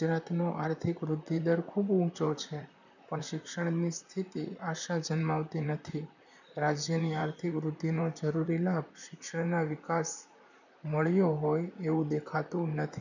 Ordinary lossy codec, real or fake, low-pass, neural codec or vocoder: none; fake; 7.2 kHz; vocoder, 44.1 kHz, 128 mel bands, Pupu-Vocoder